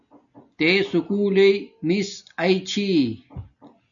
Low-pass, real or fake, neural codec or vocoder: 7.2 kHz; real; none